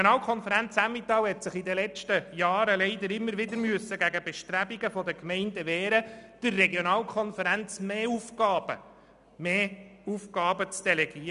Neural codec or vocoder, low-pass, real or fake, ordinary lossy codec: none; 10.8 kHz; real; none